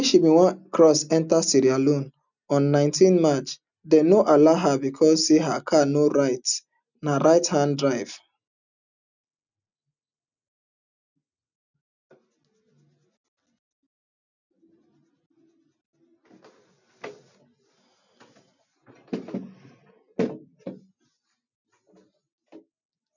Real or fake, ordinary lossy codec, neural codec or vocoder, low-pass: real; none; none; 7.2 kHz